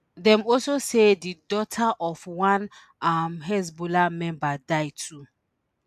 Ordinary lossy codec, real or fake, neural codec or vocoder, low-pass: AAC, 96 kbps; real; none; 14.4 kHz